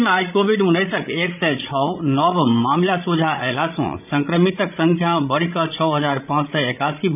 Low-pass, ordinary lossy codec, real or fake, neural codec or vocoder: 3.6 kHz; none; fake; codec, 16 kHz, 16 kbps, FreqCodec, larger model